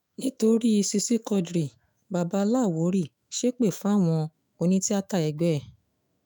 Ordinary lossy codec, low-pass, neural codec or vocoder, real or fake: none; none; autoencoder, 48 kHz, 128 numbers a frame, DAC-VAE, trained on Japanese speech; fake